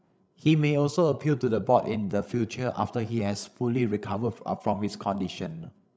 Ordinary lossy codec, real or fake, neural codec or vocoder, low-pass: none; fake; codec, 16 kHz, 8 kbps, FreqCodec, larger model; none